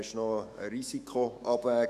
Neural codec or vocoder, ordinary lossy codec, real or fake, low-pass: autoencoder, 48 kHz, 128 numbers a frame, DAC-VAE, trained on Japanese speech; none; fake; 14.4 kHz